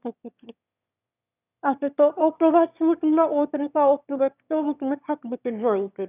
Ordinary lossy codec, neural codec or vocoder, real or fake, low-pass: none; autoencoder, 22.05 kHz, a latent of 192 numbers a frame, VITS, trained on one speaker; fake; 3.6 kHz